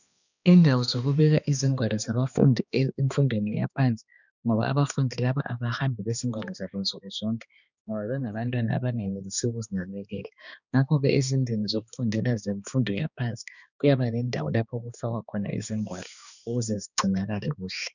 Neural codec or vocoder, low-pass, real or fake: codec, 16 kHz, 2 kbps, X-Codec, HuBERT features, trained on balanced general audio; 7.2 kHz; fake